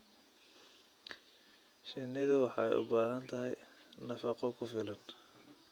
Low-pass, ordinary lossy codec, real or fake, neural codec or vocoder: 19.8 kHz; Opus, 64 kbps; fake; vocoder, 48 kHz, 128 mel bands, Vocos